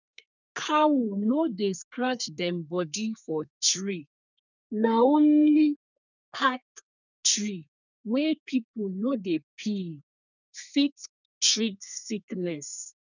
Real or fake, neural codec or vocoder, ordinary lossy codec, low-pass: fake; codec, 32 kHz, 1.9 kbps, SNAC; none; 7.2 kHz